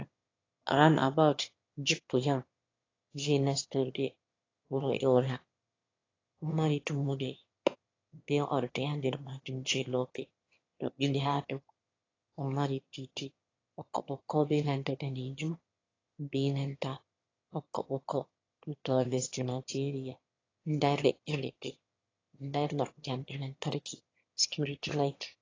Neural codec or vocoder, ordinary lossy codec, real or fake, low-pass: autoencoder, 22.05 kHz, a latent of 192 numbers a frame, VITS, trained on one speaker; AAC, 32 kbps; fake; 7.2 kHz